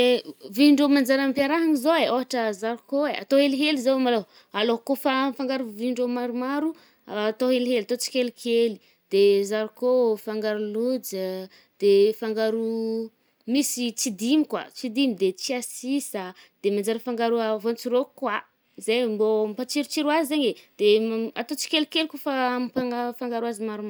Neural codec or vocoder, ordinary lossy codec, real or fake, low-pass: none; none; real; none